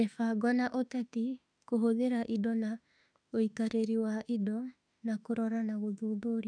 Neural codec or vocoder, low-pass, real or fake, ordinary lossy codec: autoencoder, 48 kHz, 32 numbers a frame, DAC-VAE, trained on Japanese speech; 9.9 kHz; fake; none